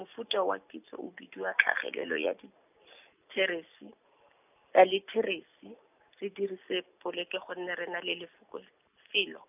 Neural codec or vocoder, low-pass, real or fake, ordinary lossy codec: none; 3.6 kHz; real; none